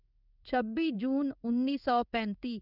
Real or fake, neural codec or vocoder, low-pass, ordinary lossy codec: fake; codec, 16 kHz in and 24 kHz out, 1 kbps, XY-Tokenizer; 5.4 kHz; none